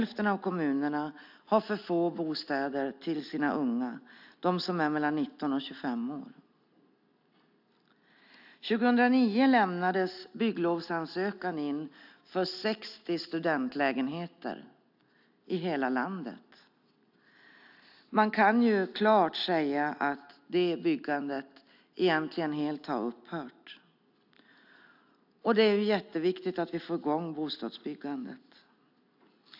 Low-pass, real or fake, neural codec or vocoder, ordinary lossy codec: 5.4 kHz; real; none; AAC, 48 kbps